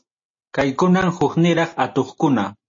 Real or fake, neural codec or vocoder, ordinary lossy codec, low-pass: real; none; MP3, 32 kbps; 7.2 kHz